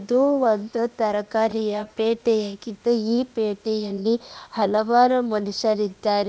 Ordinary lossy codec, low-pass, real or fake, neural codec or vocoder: none; none; fake; codec, 16 kHz, 0.8 kbps, ZipCodec